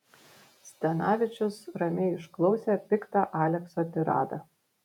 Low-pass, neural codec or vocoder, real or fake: 19.8 kHz; vocoder, 48 kHz, 128 mel bands, Vocos; fake